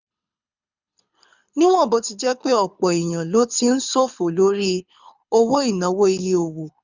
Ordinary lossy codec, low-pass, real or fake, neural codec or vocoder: none; 7.2 kHz; fake; codec, 24 kHz, 6 kbps, HILCodec